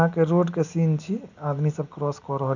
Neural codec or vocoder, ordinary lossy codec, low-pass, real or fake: none; none; 7.2 kHz; real